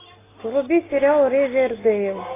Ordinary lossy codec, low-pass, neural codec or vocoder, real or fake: AAC, 16 kbps; 3.6 kHz; none; real